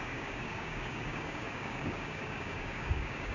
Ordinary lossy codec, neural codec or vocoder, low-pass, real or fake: none; codec, 16 kHz, 6 kbps, DAC; 7.2 kHz; fake